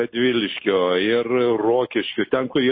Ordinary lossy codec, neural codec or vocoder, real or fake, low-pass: MP3, 24 kbps; none; real; 5.4 kHz